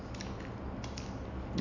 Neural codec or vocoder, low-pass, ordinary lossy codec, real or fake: none; 7.2 kHz; none; real